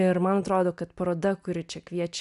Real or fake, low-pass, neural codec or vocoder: real; 10.8 kHz; none